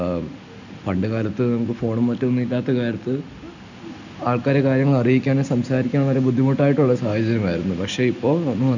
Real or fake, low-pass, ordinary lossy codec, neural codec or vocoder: real; 7.2 kHz; none; none